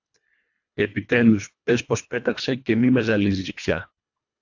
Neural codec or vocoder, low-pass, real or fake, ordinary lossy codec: codec, 24 kHz, 1.5 kbps, HILCodec; 7.2 kHz; fake; AAC, 48 kbps